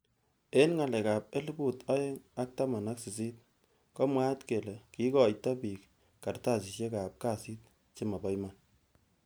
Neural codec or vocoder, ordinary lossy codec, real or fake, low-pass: none; none; real; none